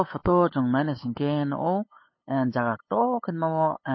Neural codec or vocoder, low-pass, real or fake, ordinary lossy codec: codec, 16 kHz, 8 kbps, FunCodec, trained on LibriTTS, 25 frames a second; 7.2 kHz; fake; MP3, 24 kbps